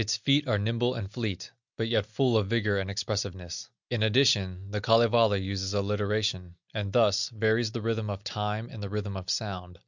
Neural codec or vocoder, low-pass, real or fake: none; 7.2 kHz; real